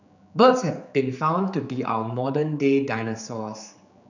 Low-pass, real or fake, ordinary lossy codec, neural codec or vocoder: 7.2 kHz; fake; none; codec, 16 kHz, 4 kbps, X-Codec, HuBERT features, trained on general audio